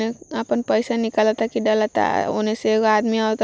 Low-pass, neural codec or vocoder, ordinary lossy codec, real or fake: none; none; none; real